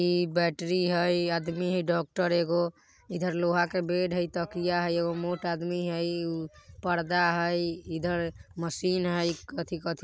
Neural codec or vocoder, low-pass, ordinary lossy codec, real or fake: none; none; none; real